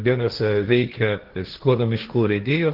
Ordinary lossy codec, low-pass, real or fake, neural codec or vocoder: Opus, 16 kbps; 5.4 kHz; fake; codec, 16 kHz, 1.1 kbps, Voila-Tokenizer